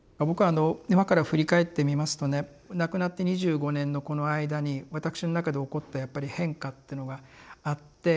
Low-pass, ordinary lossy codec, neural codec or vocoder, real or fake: none; none; none; real